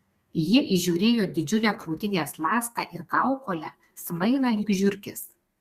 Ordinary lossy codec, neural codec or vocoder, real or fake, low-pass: Opus, 64 kbps; codec, 32 kHz, 1.9 kbps, SNAC; fake; 14.4 kHz